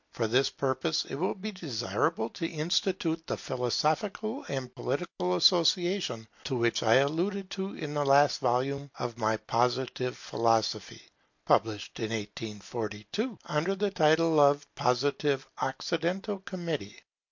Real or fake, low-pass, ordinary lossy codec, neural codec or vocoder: real; 7.2 kHz; MP3, 48 kbps; none